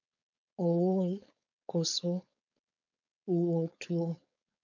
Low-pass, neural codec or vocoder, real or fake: 7.2 kHz; codec, 16 kHz, 4.8 kbps, FACodec; fake